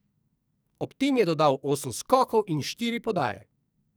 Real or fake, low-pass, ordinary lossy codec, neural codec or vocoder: fake; none; none; codec, 44.1 kHz, 2.6 kbps, SNAC